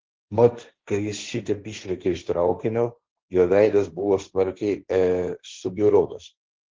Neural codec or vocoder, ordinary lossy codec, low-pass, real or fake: codec, 16 kHz, 1.1 kbps, Voila-Tokenizer; Opus, 16 kbps; 7.2 kHz; fake